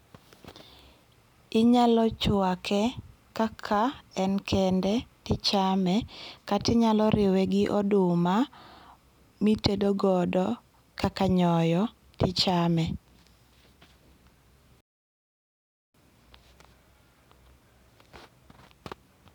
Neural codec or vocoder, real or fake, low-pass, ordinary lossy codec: none; real; 19.8 kHz; none